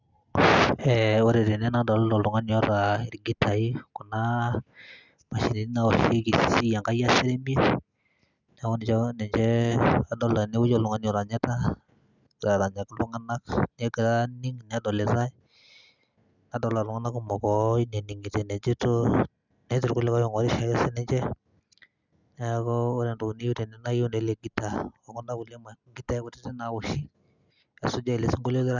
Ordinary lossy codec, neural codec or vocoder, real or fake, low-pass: none; none; real; 7.2 kHz